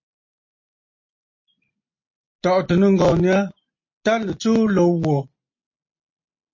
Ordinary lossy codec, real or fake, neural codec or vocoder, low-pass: MP3, 32 kbps; real; none; 7.2 kHz